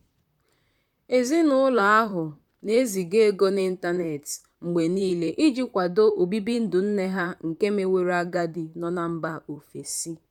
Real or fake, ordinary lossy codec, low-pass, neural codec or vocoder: fake; none; 19.8 kHz; vocoder, 44.1 kHz, 128 mel bands, Pupu-Vocoder